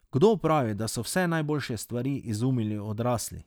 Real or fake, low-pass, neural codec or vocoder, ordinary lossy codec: real; none; none; none